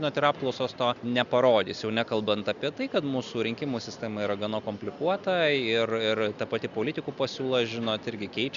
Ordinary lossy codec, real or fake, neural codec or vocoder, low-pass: Opus, 64 kbps; real; none; 7.2 kHz